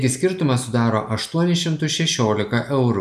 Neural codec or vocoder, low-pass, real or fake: none; 14.4 kHz; real